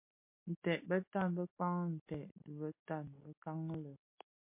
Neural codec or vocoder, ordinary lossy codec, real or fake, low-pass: none; MP3, 24 kbps; real; 3.6 kHz